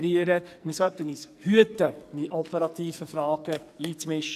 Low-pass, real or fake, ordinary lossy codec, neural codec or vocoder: 14.4 kHz; fake; none; codec, 44.1 kHz, 2.6 kbps, SNAC